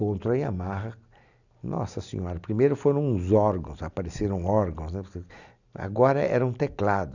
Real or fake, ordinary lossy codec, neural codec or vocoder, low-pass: real; none; none; 7.2 kHz